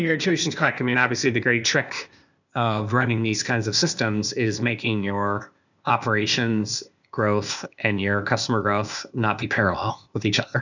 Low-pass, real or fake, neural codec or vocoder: 7.2 kHz; fake; codec, 16 kHz, 0.8 kbps, ZipCodec